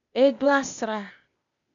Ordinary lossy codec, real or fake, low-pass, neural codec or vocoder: MP3, 96 kbps; fake; 7.2 kHz; codec, 16 kHz, 0.8 kbps, ZipCodec